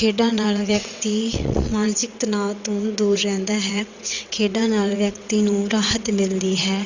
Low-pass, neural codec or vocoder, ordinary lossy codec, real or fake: 7.2 kHz; vocoder, 22.05 kHz, 80 mel bands, WaveNeXt; Opus, 64 kbps; fake